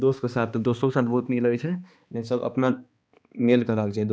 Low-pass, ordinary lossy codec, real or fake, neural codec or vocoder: none; none; fake; codec, 16 kHz, 2 kbps, X-Codec, HuBERT features, trained on balanced general audio